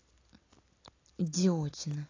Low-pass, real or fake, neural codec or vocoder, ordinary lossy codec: 7.2 kHz; real; none; AAC, 32 kbps